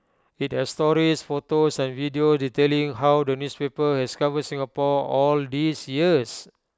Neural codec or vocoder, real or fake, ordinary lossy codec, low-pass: none; real; none; none